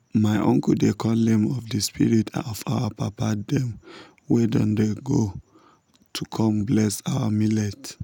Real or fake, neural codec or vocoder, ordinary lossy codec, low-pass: real; none; none; 19.8 kHz